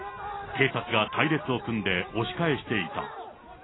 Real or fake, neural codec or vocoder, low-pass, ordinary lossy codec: real; none; 7.2 kHz; AAC, 16 kbps